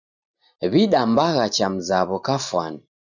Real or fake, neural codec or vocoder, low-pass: real; none; 7.2 kHz